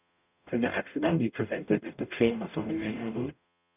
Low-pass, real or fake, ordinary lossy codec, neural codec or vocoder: 3.6 kHz; fake; none; codec, 44.1 kHz, 0.9 kbps, DAC